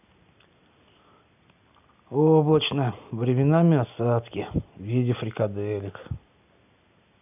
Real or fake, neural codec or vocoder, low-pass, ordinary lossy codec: real; none; 3.6 kHz; none